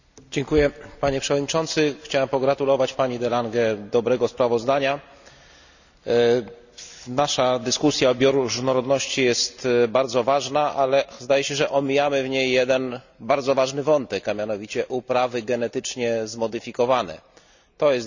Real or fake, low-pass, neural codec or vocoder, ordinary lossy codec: real; 7.2 kHz; none; none